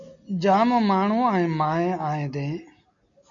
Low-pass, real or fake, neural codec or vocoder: 7.2 kHz; real; none